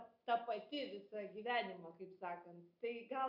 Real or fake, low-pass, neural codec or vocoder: real; 5.4 kHz; none